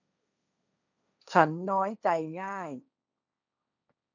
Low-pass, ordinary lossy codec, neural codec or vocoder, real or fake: 7.2 kHz; none; codec, 16 kHz, 1.1 kbps, Voila-Tokenizer; fake